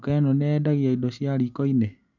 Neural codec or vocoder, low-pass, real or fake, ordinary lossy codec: none; 7.2 kHz; real; none